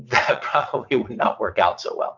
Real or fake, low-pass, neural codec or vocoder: fake; 7.2 kHz; vocoder, 22.05 kHz, 80 mel bands, WaveNeXt